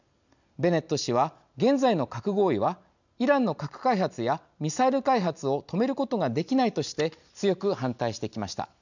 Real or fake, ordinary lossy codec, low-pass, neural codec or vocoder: real; none; 7.2 kHz; none